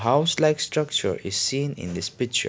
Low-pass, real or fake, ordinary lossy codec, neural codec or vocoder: none; real; none; none